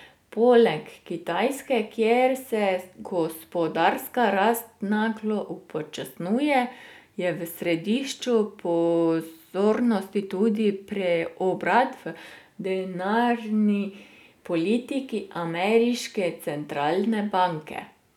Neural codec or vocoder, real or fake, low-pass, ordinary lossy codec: none; real; 19.8 kHz; none